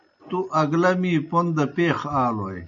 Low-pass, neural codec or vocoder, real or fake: 7.2 kHz; none; real